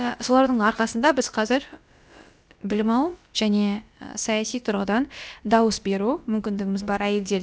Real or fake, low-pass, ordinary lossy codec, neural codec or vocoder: fake; none; none; codec, 16 kHz, about 1 kbps, DyCAST, with the encoder's durations